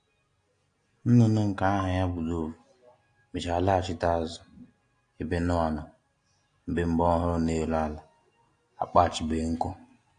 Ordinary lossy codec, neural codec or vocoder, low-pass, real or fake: AAC, 48 kbps; none; 9.9 kHz; real